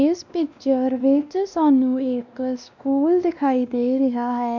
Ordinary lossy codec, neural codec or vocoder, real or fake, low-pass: none; codec, 16 kHz, 1 kbps, X-Codec, WavLM features, trained on Multilingual LibriSpeech; fake; 7.2 kHz